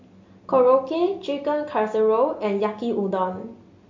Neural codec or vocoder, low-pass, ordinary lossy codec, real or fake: none; 7.2 kHz; MP3, 48 kbps; real